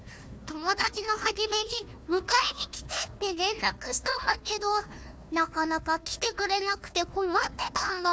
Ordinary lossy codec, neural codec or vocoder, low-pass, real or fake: none; codec, 16 kHz, 1 kbps, FunCodec, trained on Chinese and English, 50 frames a second; none; fake